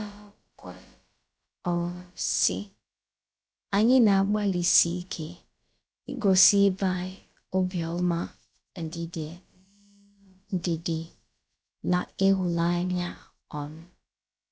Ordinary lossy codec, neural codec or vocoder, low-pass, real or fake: none; codec, 16 kHz, about 1 kbps, DyCAST, with the encoder's durations; none; fake